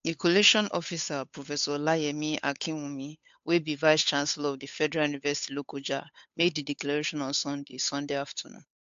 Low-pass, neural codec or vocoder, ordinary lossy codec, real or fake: 7.2 kHz; codec, 16 kHz, 8 kbps, FunCodec, trained on Chinese and English, 25 frames a second; AAC, 64 kbps; fake